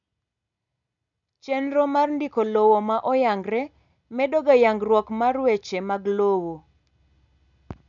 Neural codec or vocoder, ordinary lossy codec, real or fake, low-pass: none; none; real; 7.2 kHz